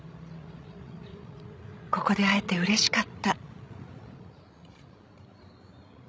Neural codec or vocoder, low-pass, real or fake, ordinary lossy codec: codec, 16 kHz, 16 kbps, FreqCodec, larger model; none; fake; none